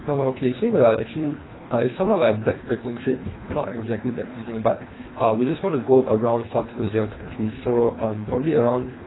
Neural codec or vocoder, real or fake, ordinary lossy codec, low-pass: codec, 24 kHz, 1.5 kbps, HILCodec; fake; AAC, 16 kbps; 7.2 kHz